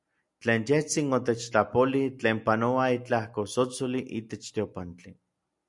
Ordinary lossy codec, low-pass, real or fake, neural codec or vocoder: MP3, 96 kbps; 10.8 kHz; real; none